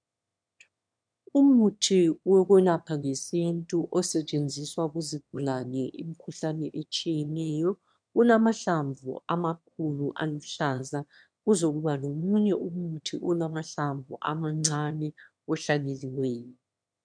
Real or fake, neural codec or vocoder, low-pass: fake; autoencoder, 22.05 kHz, a latent of 192 numbers a frame, VITS, trained on one speaker; 9.9 kHz